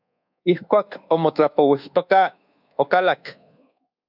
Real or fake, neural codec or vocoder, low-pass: fake; codec, 24 kHz, 1.2 kbps, DualCodec; 5.4 kHz